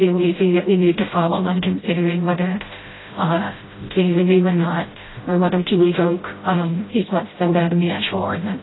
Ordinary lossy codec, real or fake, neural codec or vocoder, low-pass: AAC, 16 kbps; fake; codec, 16 kHz, 0.5 kbps, FreqCodec, smaller model; 7.2 kHz